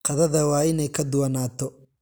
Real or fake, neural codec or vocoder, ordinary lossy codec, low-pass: real; none; none; none